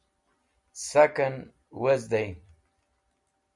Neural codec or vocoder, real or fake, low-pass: none; real; 10.8 kHz